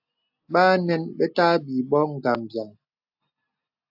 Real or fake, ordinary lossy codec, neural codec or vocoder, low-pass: real; AAC, 48 kbps; none; 5.4 kHz